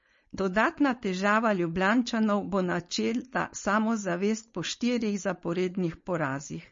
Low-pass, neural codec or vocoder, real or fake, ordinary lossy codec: 7.2 kHz; codec, 16 kHz, 4.8 kbps, FACodec; fake; MP3, 32 kbps